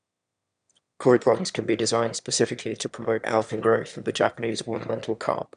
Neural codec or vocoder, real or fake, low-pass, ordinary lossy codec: autoencoder, 22.05 kHz, a latent of 192 numbers a frame, VITS, trained on one speaker; fake; 9.9 kHz; none